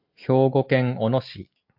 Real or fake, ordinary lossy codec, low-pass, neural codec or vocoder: real; MP3, 48 kbps; 5.4 kHz; none